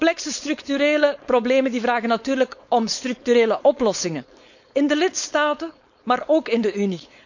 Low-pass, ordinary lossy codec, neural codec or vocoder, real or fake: 7.2 kHz; none; codec, 16 kHz, 4.8 kbps, FACodec; fake